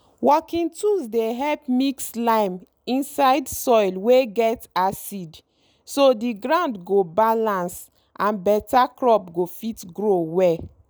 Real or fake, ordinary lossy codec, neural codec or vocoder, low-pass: real; none; none; none